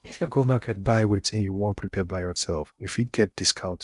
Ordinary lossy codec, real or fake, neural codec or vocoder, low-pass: none; fake; codec, 16 kHz in and 24 kHz out, 0.6 kbps, FocalCodec, streaming, 2048 codes; 10.8 kHz